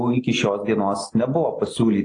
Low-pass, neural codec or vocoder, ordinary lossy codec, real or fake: 9.9 kHz; none; AAC, 32 kbps; real